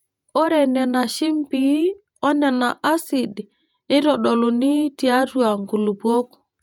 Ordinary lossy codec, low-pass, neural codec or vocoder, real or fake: none; 19.8 kHz; vocoder, 48 kHz, 128 mel bands, Vocos; fake